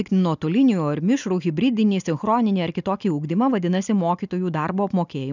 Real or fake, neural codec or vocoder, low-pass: real; none; 7.2 kHz